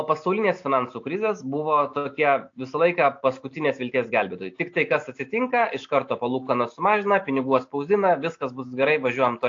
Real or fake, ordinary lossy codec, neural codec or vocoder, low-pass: real; AAC, 48 kbps; none; 7.2 kHz